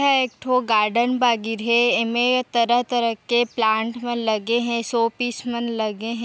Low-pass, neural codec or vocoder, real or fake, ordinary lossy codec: none; none; real; none